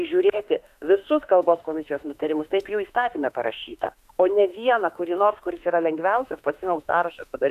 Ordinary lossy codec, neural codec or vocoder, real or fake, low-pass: MP3, 96 kbps; autoencoder, 48 kHz, 32 numbers a frame, DAC-VAE, trained on Japanese speech; fake; 14.4 kHz